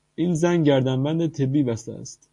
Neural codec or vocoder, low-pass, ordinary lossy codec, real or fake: none; 10.8 kHz; MP3, 48 kbps; real